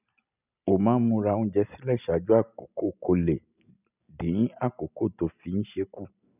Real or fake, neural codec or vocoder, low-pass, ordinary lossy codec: real; none; 3.6 kHz; none